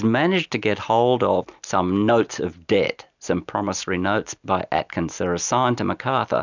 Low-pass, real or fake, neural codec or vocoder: 7.2 kHz; real; none